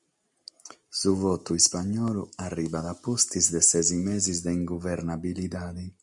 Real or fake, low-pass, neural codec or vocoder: real; 10.8 kHz; none